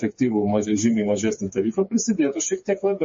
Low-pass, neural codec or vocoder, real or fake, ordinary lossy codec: 7.2 kHz; codec, 16 kHz, 4 kbps, FreqCodec, smaller model; fake; MP3, 32 kbps